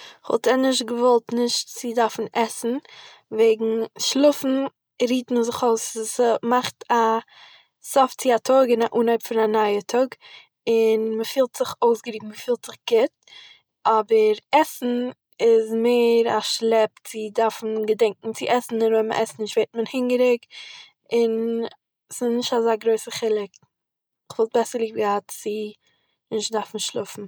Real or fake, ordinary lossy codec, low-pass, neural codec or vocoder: real; none; none; none